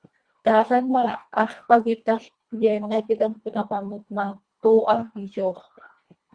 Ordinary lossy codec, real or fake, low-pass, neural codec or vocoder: Opus, 64 kbps; fake; 9.9 kHz; codec, 24 kHz, 1.5 kbps, HILCodec